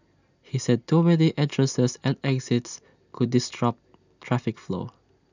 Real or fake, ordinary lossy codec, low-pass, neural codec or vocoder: real; none; 7.2 kHz; none